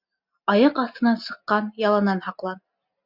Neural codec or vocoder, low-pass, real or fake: none; 5.4 kHz; real